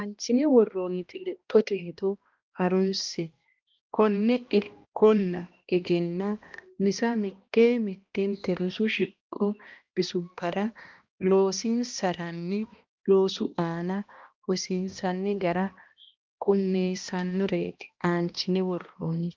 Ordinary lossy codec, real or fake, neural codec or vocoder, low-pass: Opus, 24 kbps; fake; codec, 16 kHz, 1 kbps, X-Codec, HuBERT features, trained on balanced general audio; 7.2 kHz